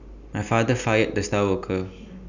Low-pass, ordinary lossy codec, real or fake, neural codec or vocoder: 7.2 kHz; none; real; none